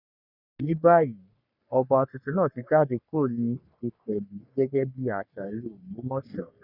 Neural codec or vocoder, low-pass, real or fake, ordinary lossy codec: codec, 44.1 kHz, 3.4 kbps, Pupu-Codec; 5.4 kHz; fake; none